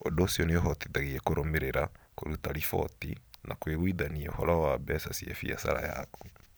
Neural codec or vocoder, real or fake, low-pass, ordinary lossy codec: vocoder, 44.1 kHz, 128 mel bands every 256 samples, BigVGAN v2; fake; none; none